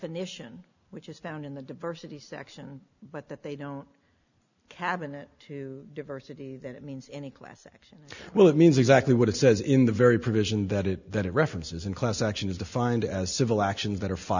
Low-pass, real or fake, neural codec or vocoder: 7.2 kHz; real; none